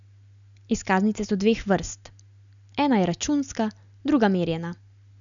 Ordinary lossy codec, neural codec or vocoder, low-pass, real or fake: none; none; 7.2 kHz; real